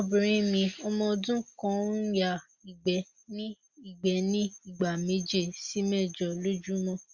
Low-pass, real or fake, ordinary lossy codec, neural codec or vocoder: 7.2 kHz; real; Opus, 64 kbps; none